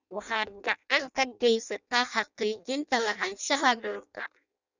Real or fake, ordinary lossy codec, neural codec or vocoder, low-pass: fake; none; codec, 16 kHz in and 24 kHz out, 0.6 kbps, FireRedTTS-2 codec; 7.2 kHz